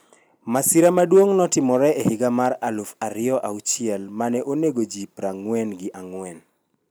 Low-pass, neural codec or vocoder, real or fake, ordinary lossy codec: none; none; real; none